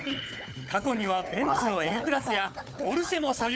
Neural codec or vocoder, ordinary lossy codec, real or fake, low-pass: codec, 16 kHz, 4 kbps, FunCodec, trained on Chinese and English, 50 frames a second; none; fake; none